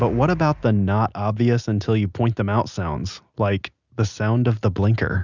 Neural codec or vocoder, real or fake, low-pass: none; real; 7.2 kHz